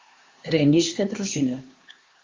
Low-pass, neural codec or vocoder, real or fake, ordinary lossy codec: 7.2 kHz; codec, 16 kHz, 4 kbps, X-Codec, HuBERT features, trained on LibriSpeech; fake; Opus, 32 kbps